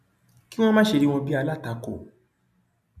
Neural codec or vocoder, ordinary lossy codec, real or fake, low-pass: none; none; real; 14.4 kHz